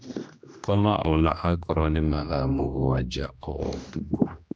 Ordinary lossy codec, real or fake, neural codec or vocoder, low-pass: none; fake; codec, 16 kHz, 1 kbps, X-Codec, HuBERT features, trained on general audio; none